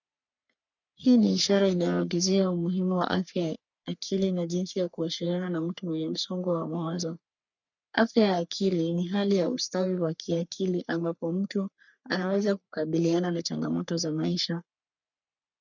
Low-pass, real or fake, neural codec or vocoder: 7.2 kHz; fake; codec, 44.1 kHz, 3.4 kbps, Pupu-Codec